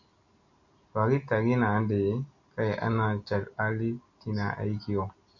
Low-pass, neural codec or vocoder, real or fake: 7.2 kHz; none; real